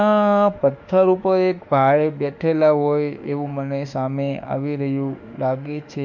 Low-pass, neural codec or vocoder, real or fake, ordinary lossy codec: 7.2 kHz; autoencoder, 48 kHz, 32 numbers a frame, DAC-VAE, trained on Japanese speech; fake; none